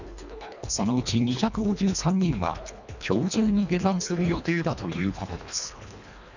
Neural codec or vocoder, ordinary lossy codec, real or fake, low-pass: codec, 24 kHz, 1.5 kbps, HILCodec; none; fake; 7.2 kHz